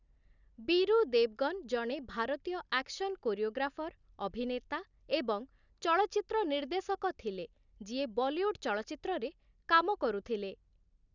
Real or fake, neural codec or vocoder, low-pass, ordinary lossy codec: real; none; 7.2 kHz; none